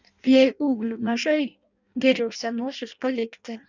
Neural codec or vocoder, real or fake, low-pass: codec, 16 kHz in and 24 kHz out, 0.6 kbps, FireRedTTS-2 codec; fake; 7.2 kHz